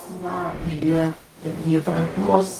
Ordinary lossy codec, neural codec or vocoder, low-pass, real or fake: Opus, 32 kbps; codec, 44.1 kHz, 0.9 kbps, DAC; 14.4 kHz; fake